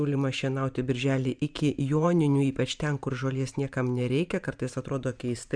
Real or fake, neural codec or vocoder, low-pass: fake; vocoder, 44.1 kHz, 128 mel bands every 512 samples, BigVGAN v2; 9.9 kHz